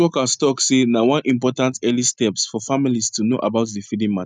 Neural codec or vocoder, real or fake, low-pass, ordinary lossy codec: none; real; 9.9 kHz; none